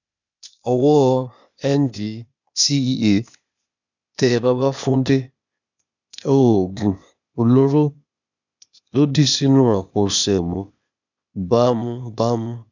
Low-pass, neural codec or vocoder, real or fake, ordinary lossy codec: 7.2 kHz; codec, 16 kHz, 0.8 kbps, ZipCodec; fake; none